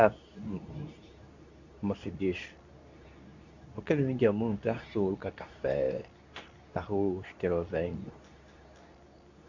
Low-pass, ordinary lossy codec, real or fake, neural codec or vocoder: 7.2 kHz; none; fake; codec, 24 kHz, 0.9 kbps, WavTokenizer, medium speech release version 2